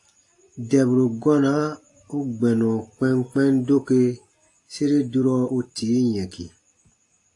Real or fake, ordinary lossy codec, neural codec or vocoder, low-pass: real; AAC, 48 kbps; none; 10.8 kHz